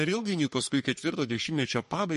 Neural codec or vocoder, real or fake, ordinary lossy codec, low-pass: codec, 44.1 kHz, 3.4 kbps, Pupu-Codec; fake; MP3, 48 kbps; 14.4 kHz